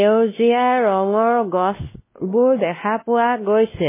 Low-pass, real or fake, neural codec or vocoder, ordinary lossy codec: 3.6 kHz; fake; codec, 16 kHz, 1 kbps, X-Codec, WavLM features, trained on Multilingual LibriSpeech; MP3, 16 kbps